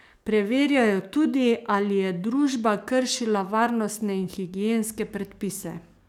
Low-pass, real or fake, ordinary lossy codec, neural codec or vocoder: 19.8 kHz; fake; none; codec, 44.1 kHz, 7.8 kbps, DAC